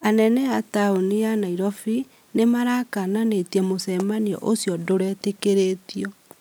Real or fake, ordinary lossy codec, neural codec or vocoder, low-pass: real; none; none; none